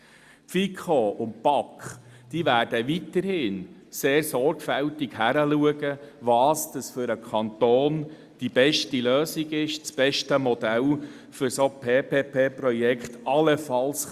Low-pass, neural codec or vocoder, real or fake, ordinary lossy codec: 14.4 kHz; none; real; Opus, 64 kbps